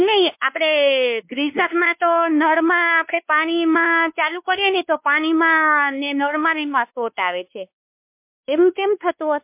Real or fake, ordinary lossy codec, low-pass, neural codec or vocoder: fake; MP3, 32 kbps; 3.6 kHz; codec, 16 kHz, 2 kbps, X-Codec, WavLM features, trained on Multilingual LibriSpeech